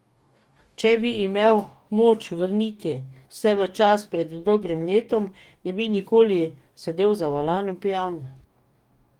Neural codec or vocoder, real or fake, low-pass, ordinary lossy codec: codec, 44.1 kHz, 2.6 kbps, DAC; fake; 19.8 kHz; Opus, 32 kbps